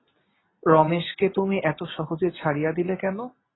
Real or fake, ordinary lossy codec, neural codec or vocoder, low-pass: real; AAC, 16 kbps; none; 7.2 kHz